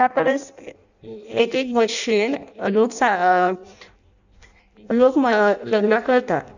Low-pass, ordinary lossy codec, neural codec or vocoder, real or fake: 7.2 kHz; none; codec, 16 kHz in and 24 kHz out, 0.6 kbps, FireRedTTS-2 codec; fake